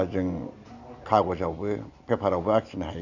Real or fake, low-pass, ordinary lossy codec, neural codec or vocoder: real; 7.2 kHz; none; none